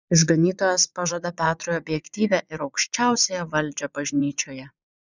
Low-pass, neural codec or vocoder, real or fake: 7.2 kHz; vocoder, 22.05 kHz, 80 mel bands, Vocos; fake